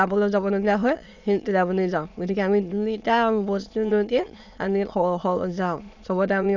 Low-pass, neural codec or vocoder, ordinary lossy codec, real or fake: 7.2 kHz; autoencoder, 22.05 kHz, a latent of 192 numbers a frame, VITS, trained on many speakers; none; fake